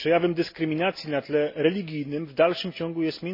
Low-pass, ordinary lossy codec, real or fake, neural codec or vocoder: 5.4 kHz; MP3, 24 kbps; real; none